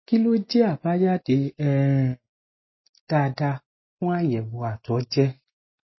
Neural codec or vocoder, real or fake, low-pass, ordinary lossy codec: none; real; 7.2 kHz; MP3, 24 kbps